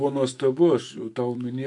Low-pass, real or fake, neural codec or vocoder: 10.8 kHz; fake; autoencoder, 48 kHz, 128 numbers a frame, DAC-VAE, trained on Japanese speech